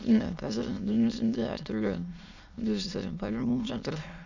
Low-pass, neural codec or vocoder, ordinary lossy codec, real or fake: 7.2 kHz; autoencoder, 22.05 kHz, a latent of 192 numbers a frame, VITS, trained on many speakers; none; fake